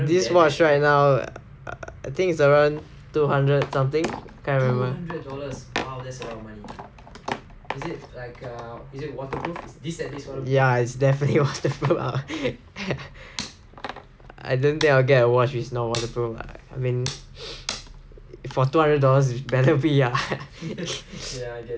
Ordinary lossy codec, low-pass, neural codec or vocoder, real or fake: none; none; none; real